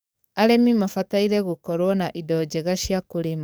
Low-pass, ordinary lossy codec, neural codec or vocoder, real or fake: none; none; codec, 44.1 kHz, 7.8 kbps, DAC; fake